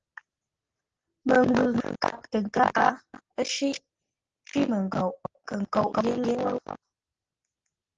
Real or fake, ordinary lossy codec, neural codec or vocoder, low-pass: real; Opus, 16 kbps; none; 7.2 kHz